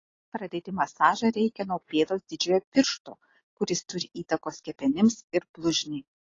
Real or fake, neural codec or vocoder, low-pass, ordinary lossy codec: real; none; 7.2 kHz; AAC, 32 kbps